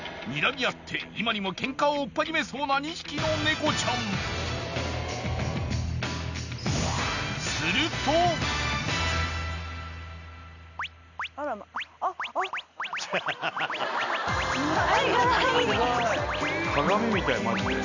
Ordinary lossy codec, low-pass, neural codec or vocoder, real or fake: none; 7.2 kHz; none; real